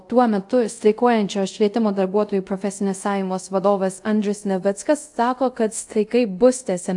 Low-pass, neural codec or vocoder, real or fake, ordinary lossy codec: 10.8 kHz; codec, 24 kHz, 0.5 kbps, DualCodec; fake; AAC, 64 kbps